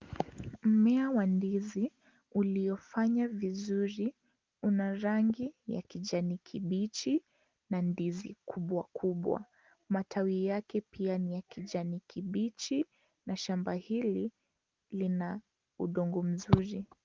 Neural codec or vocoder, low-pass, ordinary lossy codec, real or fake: none; 7.2 kHz; Opus, 32 kbps; real